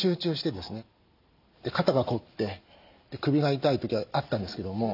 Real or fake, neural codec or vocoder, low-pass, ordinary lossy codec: real; none; 5.4 kHz; none